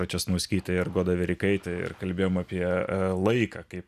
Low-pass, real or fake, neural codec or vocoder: 14.4 kHz; real; none